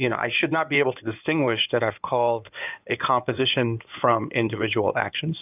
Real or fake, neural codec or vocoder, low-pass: fake; codec, 16 kHz in and 24 kHz out, 2.2 kbps, FireRedTTS-2 codec; 3.6 kHz